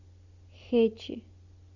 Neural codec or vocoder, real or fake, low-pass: none; real; 7.2 kHz